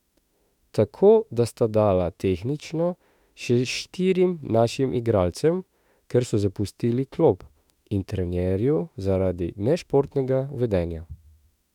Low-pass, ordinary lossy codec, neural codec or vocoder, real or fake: 19.8 kHz; none; autoencoder, 48 kHz, 32 numbers a frame, DAC-VAE, trained on Japanese speech; fake